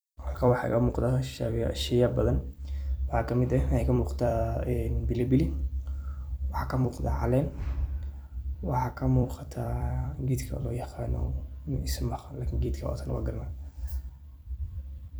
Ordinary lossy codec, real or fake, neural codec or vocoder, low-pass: none; fake; vocoder, 44.1 kHz, 128 mel bands every 256 samples, BigVGAN v2; none